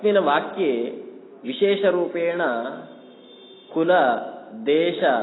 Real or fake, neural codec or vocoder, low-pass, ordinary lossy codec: real; none; 7.2 kHz; AAC, 16 kbps